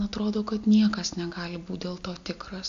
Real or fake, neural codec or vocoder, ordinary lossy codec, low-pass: real; none; AAC, 64 kbps; 7.2 kHz